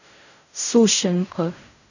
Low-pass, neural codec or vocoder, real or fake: 7.2 kHz; codec, 16 kHz in and 24 kHz out, 0.4 kbps, LongCat-Audio-Codec, fine tuned four codebook decoder; fake